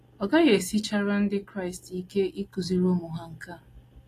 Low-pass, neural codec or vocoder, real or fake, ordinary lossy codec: 14.4 kHz; none; real; AAC, 48 kbps